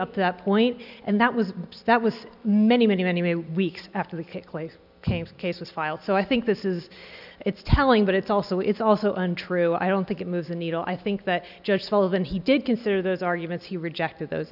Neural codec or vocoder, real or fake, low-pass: none; real; 5.4 kHz